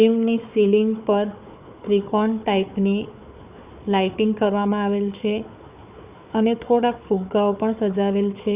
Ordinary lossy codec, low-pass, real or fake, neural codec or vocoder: Opus, 64 kbps; 3.6 kHz; fake; codec, 16 kHz, 4 kbps, FunCodec, trained on Chinese and English, 50 frames a second